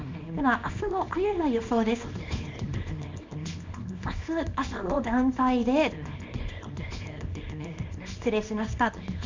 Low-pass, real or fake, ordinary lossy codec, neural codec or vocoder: 7.2 kHz; fake; MP3, 64 kbps; codec, 24 kHz, 0.9 kbps, WavTokenizer, small release